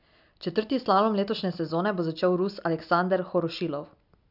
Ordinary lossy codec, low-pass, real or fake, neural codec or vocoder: none; 5.4 kHz; real; none